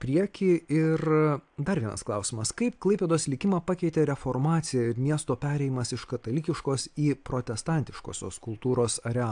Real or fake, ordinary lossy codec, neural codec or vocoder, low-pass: real; AAC, 64 kbps; none; 9.9 kHz